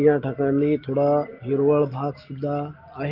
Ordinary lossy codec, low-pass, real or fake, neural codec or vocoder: Opus, 32 kbps; 5.4 kHz; real; none